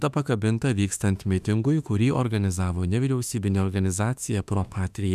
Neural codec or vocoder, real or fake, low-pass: autoencoder, 48 kHz, 32 numbers a frame, DAC-VAE, trained on Japanese speech; fake; 14.4 kHz